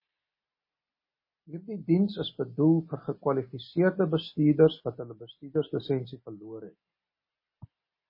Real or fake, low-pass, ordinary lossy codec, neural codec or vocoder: real; 5.4 kHz; MP3, 24 kbps; none